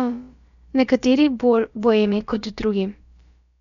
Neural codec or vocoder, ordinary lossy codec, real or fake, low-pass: codec, 16 kHz, about 1 kbps, DyCAST, with the encoder's durations; none; fake; 7.2 kHz